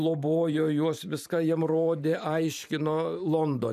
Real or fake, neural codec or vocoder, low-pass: fake; vocoder, 44.1 kHz, 128 mel bands every 512 samples, BigVGAN v2; 14.4 kHz